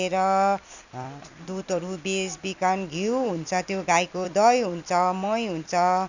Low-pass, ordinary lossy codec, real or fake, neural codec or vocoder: 7.2 kHz; none; fake; vocoder, 44.1 kHz, 128 mel bands every 256 samples, BigVGAN v2